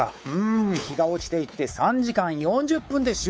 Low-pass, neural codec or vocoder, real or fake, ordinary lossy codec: none; codec, 16 kHz, 4 kbps, X-Codec, WavLM features, trained on Multilingual LibriSpeech; fake; none